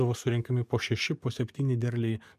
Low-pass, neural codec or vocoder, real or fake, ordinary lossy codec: 14.4 kHz; codec, 44.1 kHz, 7.8 kbps, DAC; fake; MP3, 96 kbps